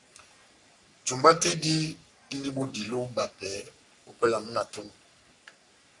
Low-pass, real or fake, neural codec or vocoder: 10.8 kHz; fake; codec, 44.1 kHz, 3.4 kbps, Pupu-Codec